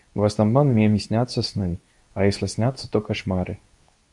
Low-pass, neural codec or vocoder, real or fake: 10.8 kHz; codec, 24 kHz, 0.9 kbps, WavTokenizer, medium speech release version 2; fake